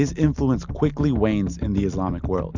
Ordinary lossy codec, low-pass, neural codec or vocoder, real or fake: Opus, 64 kbps; 7.2 kHz; none; real